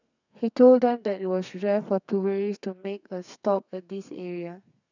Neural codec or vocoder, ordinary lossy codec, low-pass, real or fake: codec, 32 kHz, 1.9 kbps, SNAC; none; 7.2 kHz; fake